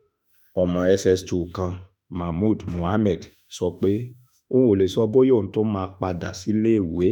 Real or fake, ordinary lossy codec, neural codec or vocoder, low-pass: fake; none; autoencoder, 48 kHz, 32 numbers a frame, DAC-VAE, trained on Japanese speech; 19.8 kHz